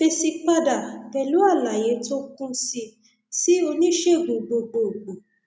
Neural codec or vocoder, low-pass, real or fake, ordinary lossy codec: none; none; real; none